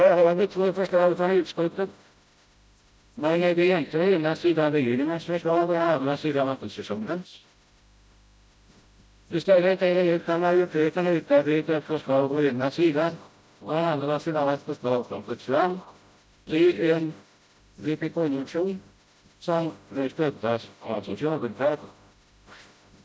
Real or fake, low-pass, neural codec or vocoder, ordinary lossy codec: fake; none; codec, 16 kHz, 0.5 kbps, FreqCodec, smaller model; none